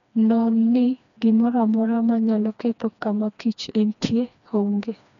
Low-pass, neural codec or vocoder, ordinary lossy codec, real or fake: 7.2 kHz; codec, 16 kHz, 2 kbps, FreqCodec, smaller model; none; fake